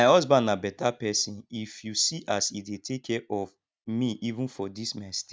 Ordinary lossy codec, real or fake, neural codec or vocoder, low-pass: none; real; none; none